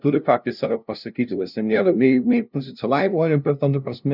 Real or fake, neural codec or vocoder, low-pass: fake; codec, 16 kHz, 0.5 kbps, FunCodec, trained on LibriTTS, 25 frames a second; 5.4 kHz